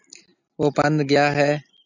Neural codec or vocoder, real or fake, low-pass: none; real; 7.2 kHz